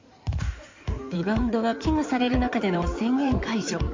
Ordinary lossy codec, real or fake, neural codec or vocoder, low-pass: MP3, 48 kbps; fake; codec, 16 kHz in and 24 kHz out, 2.2 kbps, FireRedTTS-2 codec; 7.2 kHz